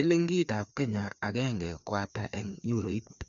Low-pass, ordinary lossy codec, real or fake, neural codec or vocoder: 7.2 kHz; none; fake; codec, 16 kHz, 2 kbps, FreqCodec, larger model